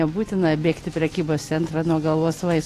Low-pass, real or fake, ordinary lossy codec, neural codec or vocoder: 14.4 kHz; real; AAC, 48 kbps; none